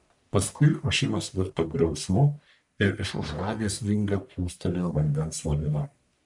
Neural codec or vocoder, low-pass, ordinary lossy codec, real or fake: codec, 44.1 kHz, 1.7 kbps, Pupu-Codec; 10.8 kHz; MP3, 96 kbps; fake